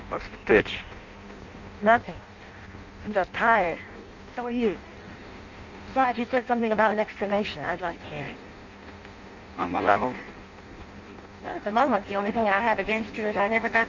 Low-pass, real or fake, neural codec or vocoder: 7.2 kHz; fake; codec, 16 kHz in and 24 kHz out, 0.6 kbps, FireRedTTS-2 codec